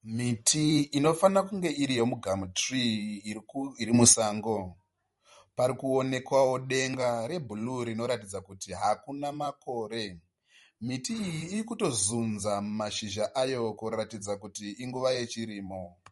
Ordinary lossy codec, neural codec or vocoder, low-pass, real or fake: MP3, 48 kbps; vocoder, 44.1 kHz, 128 mel bands every 512 samples, BigVGAN v2; 19.8 kHz; fake